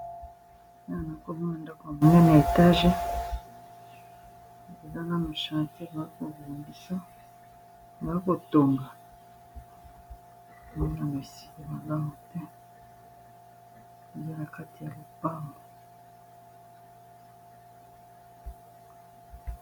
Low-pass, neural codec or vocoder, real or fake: 19.8 kHz; none; real